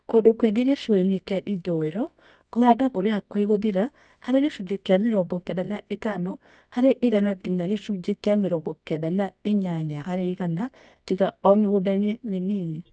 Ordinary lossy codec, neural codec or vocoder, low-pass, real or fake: none; codec, 24 kHz, 0.9 kbps, WavTokenizer, medium music audio release; 9.9 kHz; fake